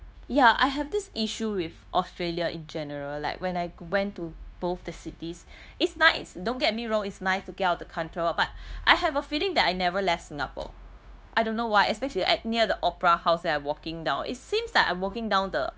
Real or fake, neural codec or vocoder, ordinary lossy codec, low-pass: fake; codec, 16 kHz, 0.9 kbps, LongCat-Audio-Codec; none; none